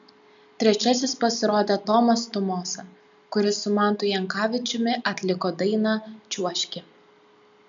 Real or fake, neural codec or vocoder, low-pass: real; none; 7.2 kHz